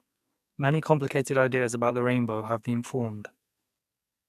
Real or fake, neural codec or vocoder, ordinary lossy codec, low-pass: fake; codec, 44.1 kHz, 2.6 kbps, SNAC; none; 14.4 kHz